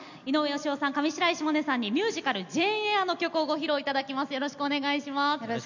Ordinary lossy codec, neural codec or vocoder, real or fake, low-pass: none; none; real; 7.2 kHz